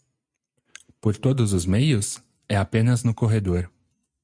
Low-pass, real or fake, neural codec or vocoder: 9.9 kHz; real; none